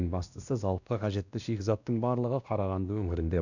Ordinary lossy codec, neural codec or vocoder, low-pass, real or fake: none; codec, 16 kHz, 1 kbps, X-Codec, WavLM features, trained on Multilingual LibriSpeech; 7.2 kHz; fake